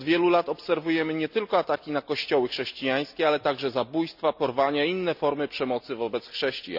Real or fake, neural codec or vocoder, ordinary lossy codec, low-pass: real; none; none; 5.4 kHz